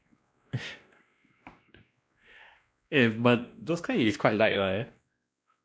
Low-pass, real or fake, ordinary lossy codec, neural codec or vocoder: none; fake; none; codec, 16 kHz, 1 kbps, X-Codec, WavLM features, trained on Multilingual LibriSpeech